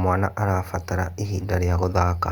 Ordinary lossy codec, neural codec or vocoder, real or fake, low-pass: Opus, 32 kbps; none; real; 19.8 kHz